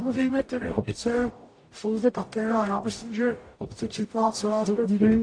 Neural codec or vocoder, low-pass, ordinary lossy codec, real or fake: codec, 44.1 kHz, 0.9 kbps, DAC; 9.9 kHz; AAC, 48 kbps; fake